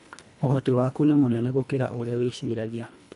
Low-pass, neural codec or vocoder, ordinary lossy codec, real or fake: 10.8 kHz; codec, 24 kHz, 1.5 kbps, HILCodec; none; fake